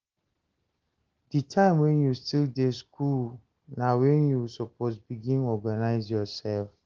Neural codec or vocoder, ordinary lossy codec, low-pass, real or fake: none; Opus, 16 kbps; 7.2 kHz; real